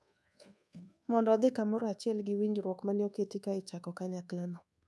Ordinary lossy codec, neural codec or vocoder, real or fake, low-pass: none; codec, 24 kHz, 1.2 kbps, DualCodec; fake; none